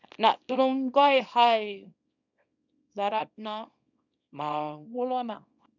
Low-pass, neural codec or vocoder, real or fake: 7.2 kHz; codec, 24 kHz, 0.9 kbps, WavTokenizer, small release; fake